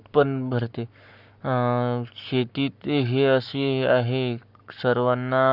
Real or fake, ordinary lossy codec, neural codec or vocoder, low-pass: fake; none; codec, 44.1 kHz, 7.8 kbps, Pupu-Codec; 5.4 kHz